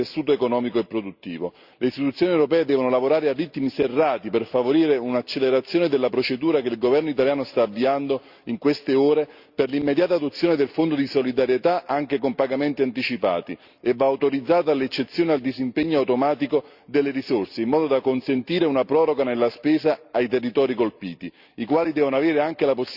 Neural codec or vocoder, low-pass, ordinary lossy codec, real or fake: none; 5.4 kHz; Opus, 64 kbps; real